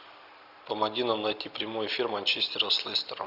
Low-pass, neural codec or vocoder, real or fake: 5.4 kHz; none; real